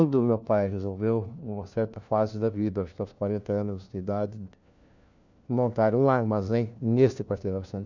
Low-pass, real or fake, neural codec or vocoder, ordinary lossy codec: 7.2 kHz; fake; codec, 16 kHz, 1 kbps, FunCodec, trained on LibriTTS, 50 frames a second; none